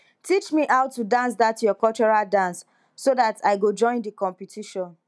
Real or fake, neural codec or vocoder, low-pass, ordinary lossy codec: real; none; none; none